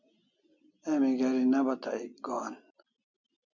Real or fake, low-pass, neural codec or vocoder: real; 7.2 kHz; none